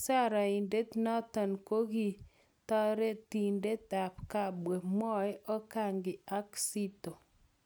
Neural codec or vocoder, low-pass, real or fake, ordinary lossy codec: none; none; real; none